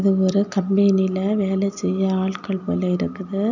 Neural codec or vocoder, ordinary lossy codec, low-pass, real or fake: none; none; 7.2 kHz; real